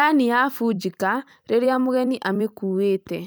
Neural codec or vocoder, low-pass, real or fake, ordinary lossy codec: vocoder, 44.1 kHz, 128 mel bands every 256 samples, BigVGAN v2; none; fake; none